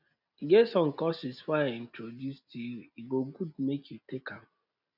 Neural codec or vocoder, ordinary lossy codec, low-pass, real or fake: none; none; 5.4 kHz; real